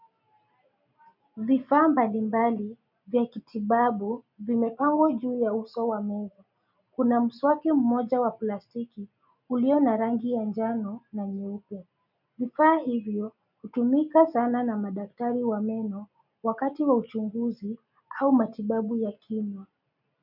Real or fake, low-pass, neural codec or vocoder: real; 5.4 kHz; none